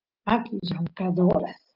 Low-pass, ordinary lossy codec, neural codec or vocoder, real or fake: 5.4 kHz; Opus, 32 kbps; none; real